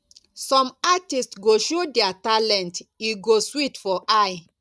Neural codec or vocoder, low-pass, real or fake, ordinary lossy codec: none; none; real; none